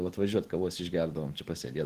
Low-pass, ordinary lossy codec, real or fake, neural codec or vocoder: 14.4 kHz; Opus, 16 kbps; real; none